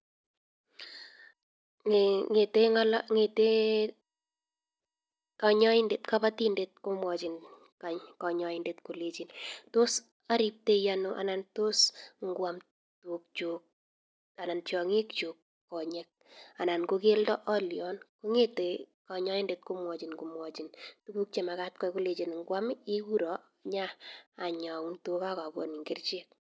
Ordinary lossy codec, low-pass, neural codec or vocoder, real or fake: none; none; none; real